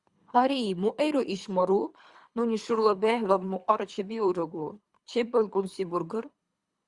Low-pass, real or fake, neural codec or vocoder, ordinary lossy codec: 10.8 kHz; fake; codec, 24 kHz, 3 kbps, HILCodec; Opus, 64 kbps